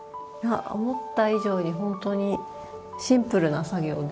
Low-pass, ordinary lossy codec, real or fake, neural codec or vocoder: none; none; real; none